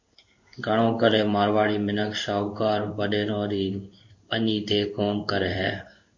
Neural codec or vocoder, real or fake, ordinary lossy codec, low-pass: codec, 16 kHz in and 24 kHz out, 1 kbps, XY-Tokenizer; fake; MP3, 48 kbps; 7.2 kHz